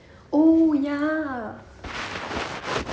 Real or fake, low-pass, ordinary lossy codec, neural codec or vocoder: real; none; none; none